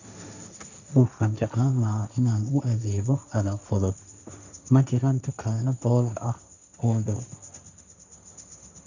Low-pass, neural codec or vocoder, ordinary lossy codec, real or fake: 7.2 kHz; codec, 16 kHz, 1.1 kbps, Voila-Tokenizer; none; fake